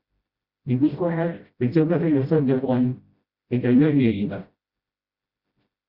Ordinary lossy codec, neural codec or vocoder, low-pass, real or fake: Opus, 64 kbps; codec, 16 kHz, 0.5 kbps, FreqCodec, smaller model; 5.4 kHz; fake